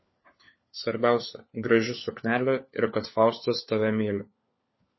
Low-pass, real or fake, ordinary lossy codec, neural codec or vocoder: 7.2 kHz; fake; MP3, 24 kbps; codec, 16 kHz, 4 kbps, FunCodec, trained on LibriTTS, 50 frames a second